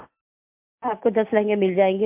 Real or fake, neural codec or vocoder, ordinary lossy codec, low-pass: real; none; MP3, 32 kbps; 3.6 kHz